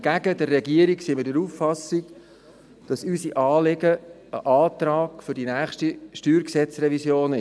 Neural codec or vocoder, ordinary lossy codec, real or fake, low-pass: none; none; real; none